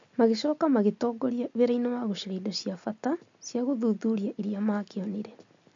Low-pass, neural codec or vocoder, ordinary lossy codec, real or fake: 7.2 kHz; none; MP3, 48 kbps; real